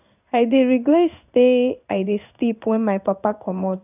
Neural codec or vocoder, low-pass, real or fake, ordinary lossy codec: codec, 16 kHz in and 24 kHz out, 1 kbps, XY-Tokenizer; 3.6 kHz; fake; none